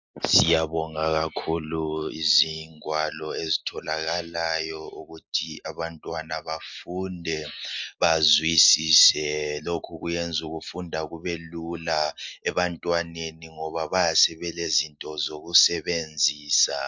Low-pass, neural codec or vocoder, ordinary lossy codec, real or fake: 7.2 kHz; none; MP3, 64 kbps; real